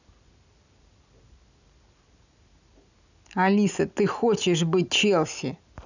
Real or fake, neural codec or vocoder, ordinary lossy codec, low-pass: real; none; none; 7.2 kHz